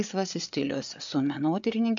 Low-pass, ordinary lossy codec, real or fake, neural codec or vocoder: 7.2 kHz; AAC, 64 kbps; fake; codec, 16 kHz, 8 kbps, FreqCodec, larger model